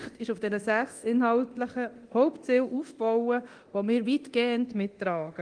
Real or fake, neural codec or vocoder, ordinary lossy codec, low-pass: fake; codec, 24 kHz, 0.9 kbps, DualCodec; Opus, 24 kbps; 9.9 kHz